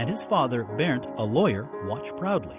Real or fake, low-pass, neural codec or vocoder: real; 3.6 kHz; none